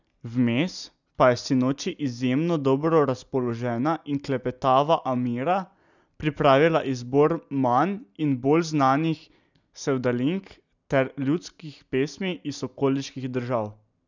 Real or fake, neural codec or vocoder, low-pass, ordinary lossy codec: real; none; 7.2 kHz; none